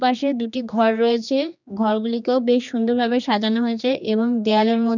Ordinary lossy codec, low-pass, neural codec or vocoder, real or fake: none; 7.2 kHz; codec, 16 kHz, 2 kbps, X-Codec, HuBERT features, trained on general audio; fake